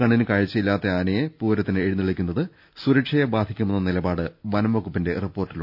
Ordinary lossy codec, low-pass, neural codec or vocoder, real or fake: none; 5.4 kHz; none; real